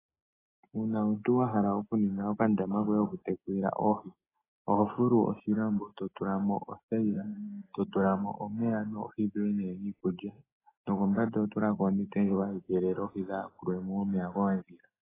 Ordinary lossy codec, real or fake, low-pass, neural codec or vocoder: AAC, 16 kbps; real; 3.6 kHz; none